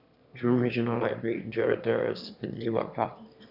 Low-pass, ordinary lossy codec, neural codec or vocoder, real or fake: 5.4 kHz; none; autoencoder, 22.05 kHz, a latent of 192 numbers a frame, VITS, trained on one speaker; fake